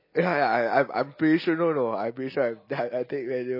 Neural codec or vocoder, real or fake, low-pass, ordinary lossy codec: none; real; 5.4 kHz; MP3, 24 kbps